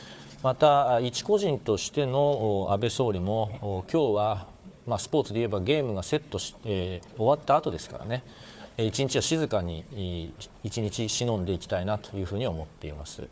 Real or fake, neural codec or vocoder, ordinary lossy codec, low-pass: fake; codec, 16 kHz, 4 kbps, FunCodec, trained on Chinese and English, 50 frames a second; none; none